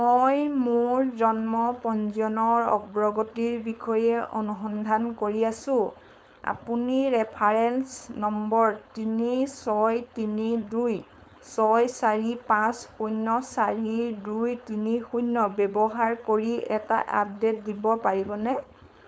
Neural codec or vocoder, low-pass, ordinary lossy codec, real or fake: codec, 16 kHz, 4.8 kbps, FACodec; none; none; fake